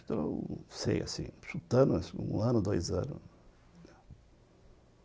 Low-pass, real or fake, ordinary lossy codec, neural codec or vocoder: none; real; none; none